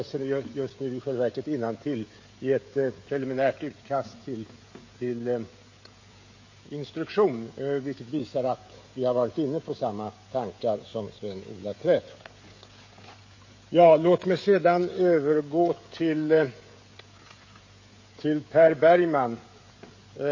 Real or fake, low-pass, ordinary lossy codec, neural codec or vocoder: fake; 7.2 kHz; MP3, 32 kbps; codec, 16 kHz, 16 kbps, FreqCodec, smaller model